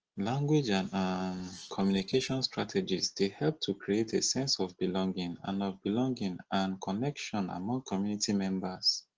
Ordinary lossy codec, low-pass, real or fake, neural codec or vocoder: Opus, 16 kbps; 7.2 kHz; real; none